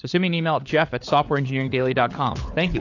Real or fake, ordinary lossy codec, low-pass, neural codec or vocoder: fake; AAC, 48 kbps; 7.2 kHz; codec, 16 kHz, 4 kbps, FunCodec, trained on LibriTTS, 50 frames a second